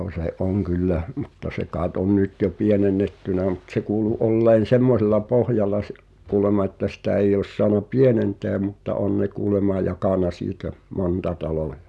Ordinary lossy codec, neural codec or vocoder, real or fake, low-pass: none; none; real; none